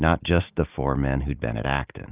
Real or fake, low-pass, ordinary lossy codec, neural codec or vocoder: real; 3.6 kHz; Opus, 24 kbps; none